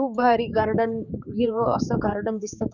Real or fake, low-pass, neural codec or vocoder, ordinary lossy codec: fake; 7.2 kHz; codec, 16 kHz, 4 kbps, X-Codec, HuBERT features, trained on general audio; none